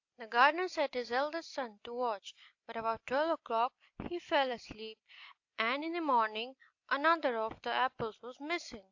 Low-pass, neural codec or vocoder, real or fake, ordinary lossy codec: 7.2 kHz; none; real; AAC, 48 kbps